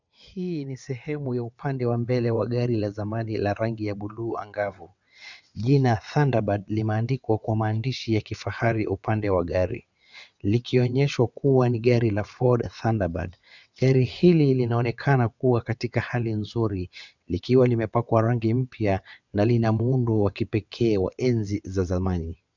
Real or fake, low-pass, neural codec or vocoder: fake; 7.2 kHz; vocoder, 22.05 kHz, 80 mel bands, WaveNeXt